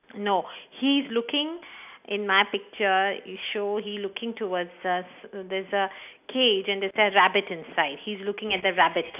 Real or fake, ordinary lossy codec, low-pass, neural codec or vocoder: real; none; 3.6 kHz; none